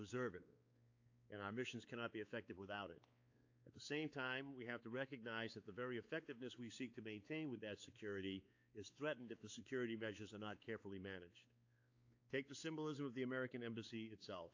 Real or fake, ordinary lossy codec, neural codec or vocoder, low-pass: fake; AAC, 48 kbps; codec, 16 kHz, 4 kbps, X-Codec, WavLM features, trained on Multilingual LibriSpeech; 7.2 kHz